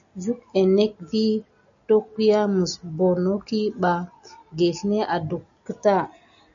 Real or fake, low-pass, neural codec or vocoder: real; 7.2 kHz; none